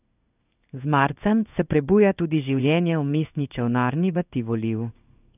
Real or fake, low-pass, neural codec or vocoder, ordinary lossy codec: fake; 3.6 kHz; codec, 16 kHz in and 24 kHz out, 1 kbps, XY-Tokenizer; none